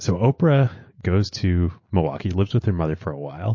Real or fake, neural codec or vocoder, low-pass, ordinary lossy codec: real; none; 7.2 kHz; MP3, 32 kbps